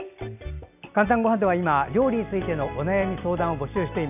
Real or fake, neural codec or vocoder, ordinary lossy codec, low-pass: real; none; none; 3.6 kHz